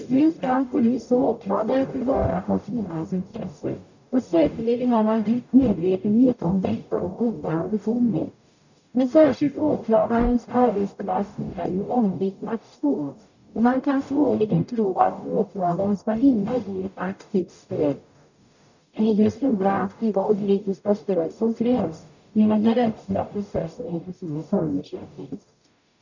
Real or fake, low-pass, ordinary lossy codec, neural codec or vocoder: fake; 7.2 kHz; none; codec, 44.1 kHz, 0.9 kbps, DAC